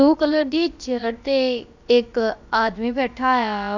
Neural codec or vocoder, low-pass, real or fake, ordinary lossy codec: codec, 16 kHz, about 1 kbps, DyCAST, with the encoder's durations; 7.2 kHz; fake; none